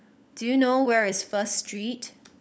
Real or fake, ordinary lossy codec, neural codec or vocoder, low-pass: fake; none; codec, 16 kHz, 16 kbps, FunCodec, trained on LibriTTS, 50 frames a second; none